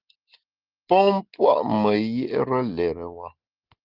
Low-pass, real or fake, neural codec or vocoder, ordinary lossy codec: 5.4 kHz; real; none; Opus, 16 kbps